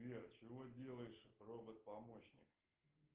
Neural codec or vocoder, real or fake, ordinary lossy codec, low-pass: none; real; Opus, 16 kbps; 3.6 kHz